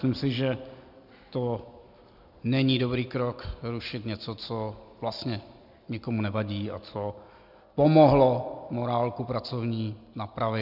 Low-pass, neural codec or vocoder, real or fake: 5.4 kHz; none; real